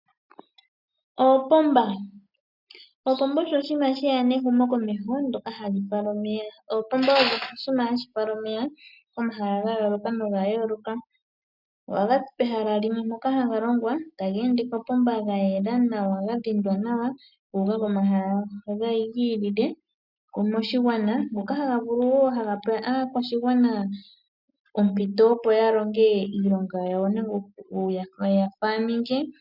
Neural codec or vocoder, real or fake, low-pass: none; real; 5.4 kHz